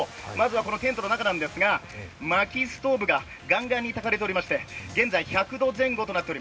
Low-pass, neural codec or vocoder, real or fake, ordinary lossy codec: none; none; real; none